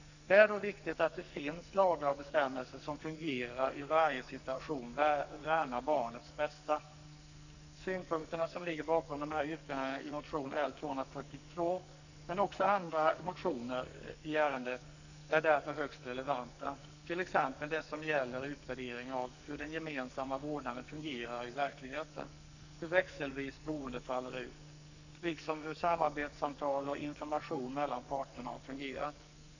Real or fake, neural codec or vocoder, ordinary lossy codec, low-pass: fake; codec, 44.1 kHz, 2.6 kbps, SNAC; none; 7.2 kHz